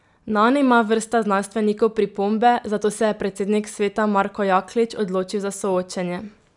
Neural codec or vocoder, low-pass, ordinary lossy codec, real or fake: none; 10.8 kHz; none; real